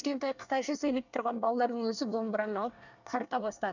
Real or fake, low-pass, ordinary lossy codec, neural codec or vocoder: fake; 7.2 kHz; none; codec, 24 kHz, 1 kbps, SNAC